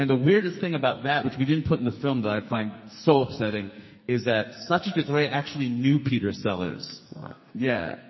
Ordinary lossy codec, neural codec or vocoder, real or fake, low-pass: MP3, 24 kbps; codec, 44.1 kHz, 2.6 kbps, SNAC; fake; 7.2 kHz